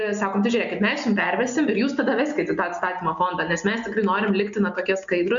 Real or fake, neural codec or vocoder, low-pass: real; none; 7.2 kHz